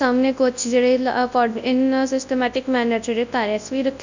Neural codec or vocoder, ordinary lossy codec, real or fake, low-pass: codec, 24 kHz, 0.9 kbps, WavTokenizer, large speech release; none; fake; 7.2 kHz